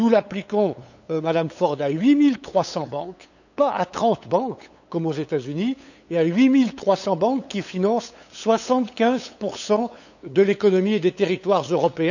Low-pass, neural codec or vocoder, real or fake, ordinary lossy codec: 7.2 kHz; codec, 16 kHz, 8 kbps, FunCodec, trained on LibriTTS, 25 frames a second; fake; none